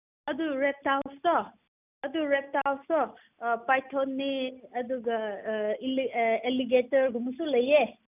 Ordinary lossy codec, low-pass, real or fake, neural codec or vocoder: none; 3.6 kHz; real; none